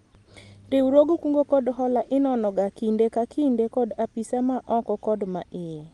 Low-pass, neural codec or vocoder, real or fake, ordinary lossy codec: 10.8 kHz; none; real; Opus, 32 kbps